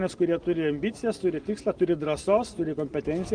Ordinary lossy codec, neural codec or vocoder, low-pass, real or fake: Opus, 24 kbps; none; 9.9 kHz; real